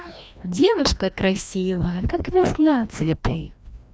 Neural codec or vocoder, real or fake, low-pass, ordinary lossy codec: codec, 16 kHz, 1 kbps, FreqCodec, larger model; fake; none; none